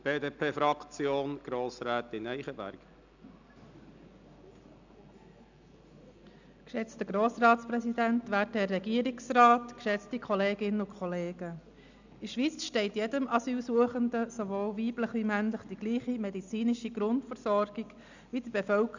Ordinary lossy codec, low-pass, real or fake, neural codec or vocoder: none; 7.2 kHz; real; none